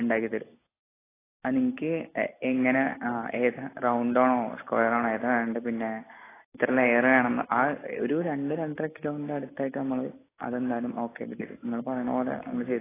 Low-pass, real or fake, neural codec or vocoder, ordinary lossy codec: 3.6 kHz; real; none; AAC, 16 kbps